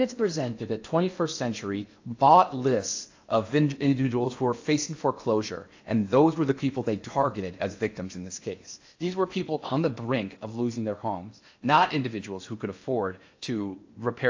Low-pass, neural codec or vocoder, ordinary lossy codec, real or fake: 7.2 kHz; codec, 16 kHz in and 24 kHz out, 0.8 kbps, FocalCodec, streaming, 65536 codes; AAC, 48 kbps; fake